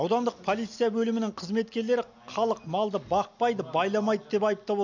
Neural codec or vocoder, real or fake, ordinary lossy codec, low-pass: none; real; none; 7.2 kHz